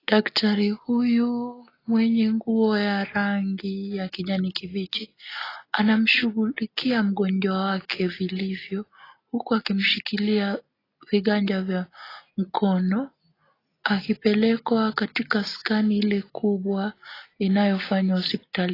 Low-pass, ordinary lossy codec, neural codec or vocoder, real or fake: 5.4 kHz; AAC, 24 kbps; none; real